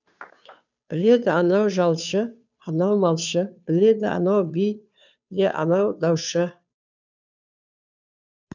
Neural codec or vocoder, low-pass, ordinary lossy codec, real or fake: codec, 16 kHz, 2 kbps, FunCodec, trained on Chinese and English, 25 frames a second; 7.2 kHz; none; fake